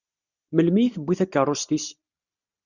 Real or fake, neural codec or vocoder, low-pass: real; none; 7.2 kHz